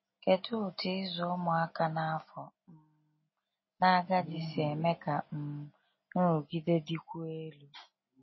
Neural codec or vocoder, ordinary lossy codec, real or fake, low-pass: none; MP3, 24 kbps; real; 7.2 kHz